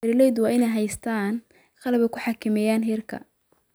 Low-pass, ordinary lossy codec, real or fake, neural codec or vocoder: none; none; real; none